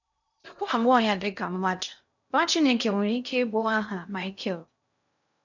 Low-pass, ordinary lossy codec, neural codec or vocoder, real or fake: 7.2 kHz; none; codec, 16 kHz in and 24 kHz out, 0.6 kbps, FocalCodec, streaming, 2048 codes; fake